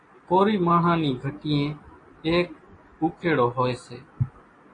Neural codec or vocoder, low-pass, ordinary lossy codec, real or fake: none; 9.9 kHz; AAC, 32 kbps; real